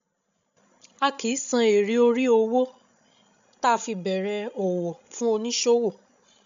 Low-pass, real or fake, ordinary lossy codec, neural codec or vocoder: 7.2 kHz; fake; MP3, 64 kbps; codec, 16 kHz, 16 kbps, FreqCodec, larger model